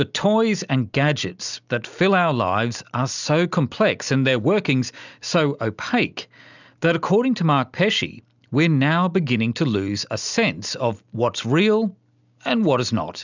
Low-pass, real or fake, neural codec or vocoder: 7.2 kHz; real; none